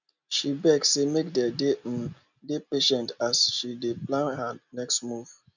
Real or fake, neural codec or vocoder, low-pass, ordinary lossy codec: real; none; 7.2 kHz; none